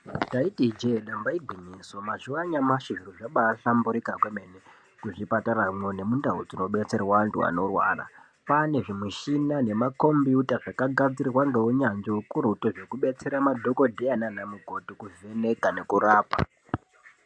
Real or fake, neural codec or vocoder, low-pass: real; none; 9.9 kHz